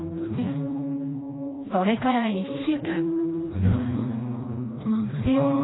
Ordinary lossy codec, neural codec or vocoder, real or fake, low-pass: AAC, 16 kbps; codec, 16 kHz, 1 kbps, FreqCodec, smaller model; fake; 7.2 kHz